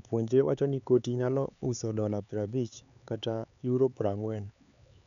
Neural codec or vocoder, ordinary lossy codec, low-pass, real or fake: codec, 16 kHz, 4 kbps, X-Codec, HuBERT features, trained on LibriSpeech; none; 7.2 kHz; fake